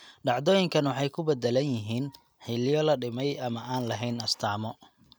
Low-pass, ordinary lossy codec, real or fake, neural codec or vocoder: none; none; real; none